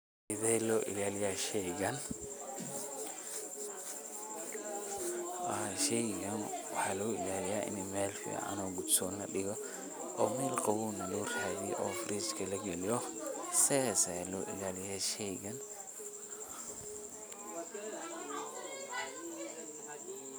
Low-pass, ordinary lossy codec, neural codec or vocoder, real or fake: none; none; none; real